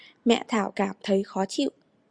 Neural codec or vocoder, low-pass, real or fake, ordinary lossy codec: none; 9.9 kHz; real; Opus, 64 kbps